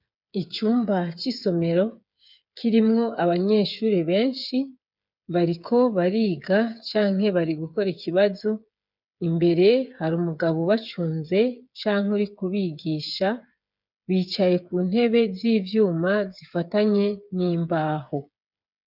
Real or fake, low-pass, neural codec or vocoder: fake; 5.4 kHz; codec, 16 kHz, 8 kbps, FreqCodec, smaller model